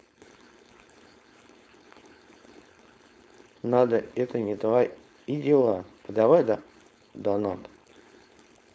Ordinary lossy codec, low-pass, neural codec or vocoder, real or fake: none; none; codec, 16 kHz, 4.8 kbps, FACodec; fake